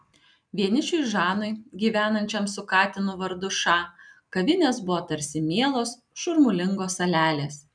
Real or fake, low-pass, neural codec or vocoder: real; 9.9 kHz; none